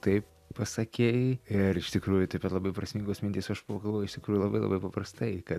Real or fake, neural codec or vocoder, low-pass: real; none; 14.4 kHz